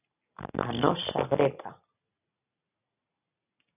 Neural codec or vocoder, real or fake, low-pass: none; real; 3.6 kHz